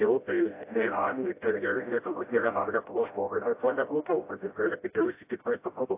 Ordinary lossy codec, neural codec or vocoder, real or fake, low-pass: AAC, 24 kbps; codec, 16 kHz, 0.5 kbps, FreqCodec, smaller model; fake; 3.6 kHz